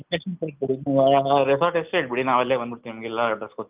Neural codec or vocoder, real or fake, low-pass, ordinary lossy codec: none; real; 3.6 kHz; Opus, 32 kbps